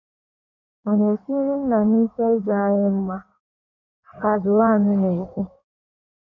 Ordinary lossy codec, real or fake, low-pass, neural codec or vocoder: none; fake; 7.2 kHz; codec, 16 kHz in and 24 kHz out, 1.1 kbps, FireRedTTS-2 codec